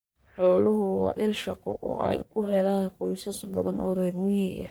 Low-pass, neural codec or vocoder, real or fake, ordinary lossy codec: none; codec, 44.1 kHz, 1.7 kbps, Pupu-Codec; fake; none